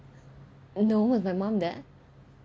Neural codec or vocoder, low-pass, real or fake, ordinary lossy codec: none; none; real; none